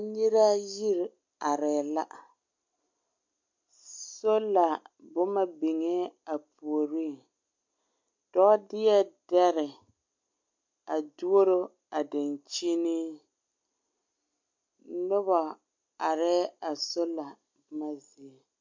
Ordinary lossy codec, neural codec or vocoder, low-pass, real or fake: MP3, 48 kbps; none; 7.2 kHz; real